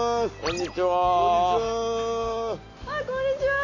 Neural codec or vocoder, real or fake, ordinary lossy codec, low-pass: none; real; AAC, 32 kbps; 7.2 kHz